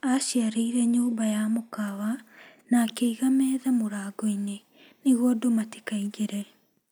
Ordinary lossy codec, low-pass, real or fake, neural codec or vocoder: none; none; real; none